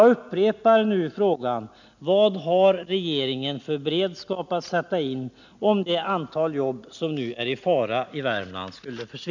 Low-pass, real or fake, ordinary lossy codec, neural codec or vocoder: 7.2 kHz; real; none; none